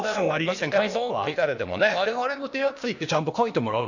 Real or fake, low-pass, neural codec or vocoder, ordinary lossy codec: fake; 7.2 kHz; codec, 16 kHz, 0.8 kbps, ZipCodec; none